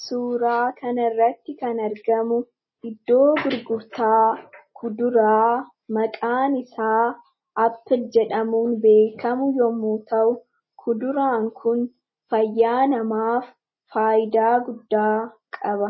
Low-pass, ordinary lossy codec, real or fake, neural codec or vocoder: 7.2 kHz; MP3, 24 kbps; real; none